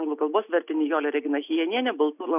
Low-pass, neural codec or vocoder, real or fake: 3.6 kHz; none; real